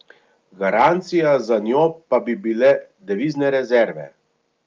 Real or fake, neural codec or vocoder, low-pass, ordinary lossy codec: real; none; 7.2 kHz; Opus, 24 kbps